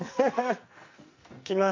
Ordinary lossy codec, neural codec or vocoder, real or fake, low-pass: MP3, 48 kbps; codec, 44.1 kHz, 2.6 kbps, SNAC; fake; 7.2 kHz